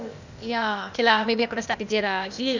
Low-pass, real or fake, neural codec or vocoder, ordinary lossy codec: 7.2 kHz; fake; codec, 16 kHz, 0.8 kbps, ZipCodec; none